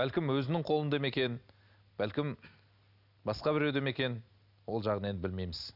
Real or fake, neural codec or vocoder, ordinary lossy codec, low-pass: real; none; none; 5.4 kHz